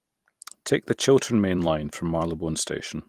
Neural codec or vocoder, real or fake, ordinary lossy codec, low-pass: vocoder, 44.1 kHz, 128 mel bands every 256 samples, BigVGAN v2; fake; Opus, 24 kbps; 14.4 kHz